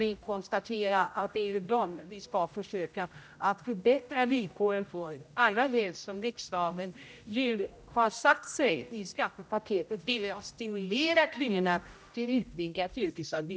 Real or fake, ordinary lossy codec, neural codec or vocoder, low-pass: fake; none; codec, 16 kHz, 0.5 kbps, X-Codec, HuBERT features, trained on general audio; none